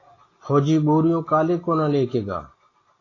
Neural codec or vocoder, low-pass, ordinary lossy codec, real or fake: none; 7.2 kHz; AAC, 32 kbps; real